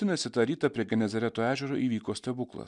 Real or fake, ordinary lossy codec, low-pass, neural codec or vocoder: real; MP3, 96 kbps; 10.8 kHz; none